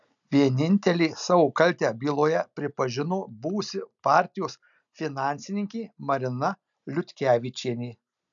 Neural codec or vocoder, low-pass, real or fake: none; 7.2 kHz; real